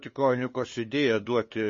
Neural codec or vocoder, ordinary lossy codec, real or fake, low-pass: codec, 16 kHz, 2 kbps, FunCodec, trained on LibriTTS, 25 frames a second; MP3, 32 kbps; fake; 7.2 kHz